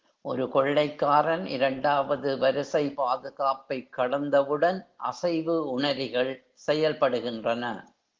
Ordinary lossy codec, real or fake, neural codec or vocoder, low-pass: Opus, 16 kbps; real; none; 7.2 kHz